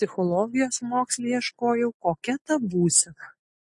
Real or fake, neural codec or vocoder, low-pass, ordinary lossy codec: real; none; 10.8 kHz; MP3, 48 kbps